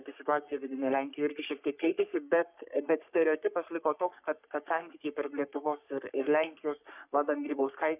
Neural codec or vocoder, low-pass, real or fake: codec, 44.1 kHz, 3.4 kbps, Pupu-Codec; 3.6 kHz; fake